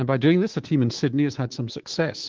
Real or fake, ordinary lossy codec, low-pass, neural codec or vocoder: fake; Opus, 16 kbps; 7.2 kHz; codec, 24 kHz, 3.1 kbps, DualCodec